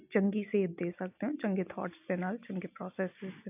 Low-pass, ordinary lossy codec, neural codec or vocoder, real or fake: 3.6 kHz; AAC, 32 kbps; none; real